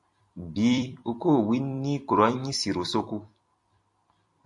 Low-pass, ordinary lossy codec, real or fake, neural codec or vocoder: 10.8 kHz; MP3, 64 kbps; fake; vocoder, 44.1 kHz, 128 mel bands every 256 samples, BigVGAN v2